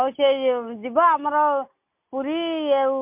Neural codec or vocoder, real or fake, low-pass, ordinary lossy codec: none; real; 3.6 kHz; MP3, 32 kbps